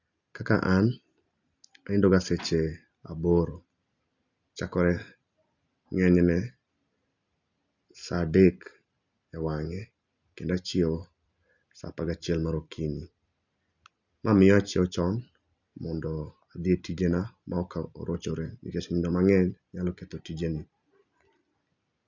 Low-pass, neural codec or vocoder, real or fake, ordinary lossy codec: 7.2 kHz; none; real; none